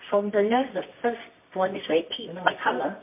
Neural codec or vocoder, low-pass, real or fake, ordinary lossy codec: codec, 24 kHz, 0.9 kbps, WavTokenizer, medium music audio release; 3.6 kHz; fake; MP3, 24 kbps